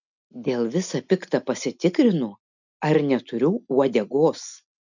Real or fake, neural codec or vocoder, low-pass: real; none; 7.2 kHz